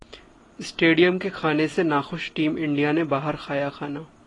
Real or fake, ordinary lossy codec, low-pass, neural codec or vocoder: real; AAC, 32 kbps; 10.8 kHz; none